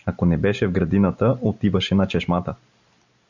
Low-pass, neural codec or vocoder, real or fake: 7.2 kHz; none; real